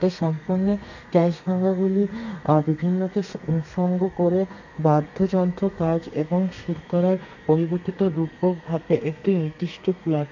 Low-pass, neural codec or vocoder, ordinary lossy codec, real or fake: 7.2 kHz; codec, 32 kHz, 1.9 kbps, SNAC; none; fake